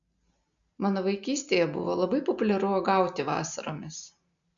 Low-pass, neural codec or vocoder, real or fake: 7.2 kHz; none; real